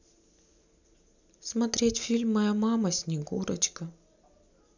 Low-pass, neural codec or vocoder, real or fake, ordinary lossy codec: 7.2 kHz; none; real; none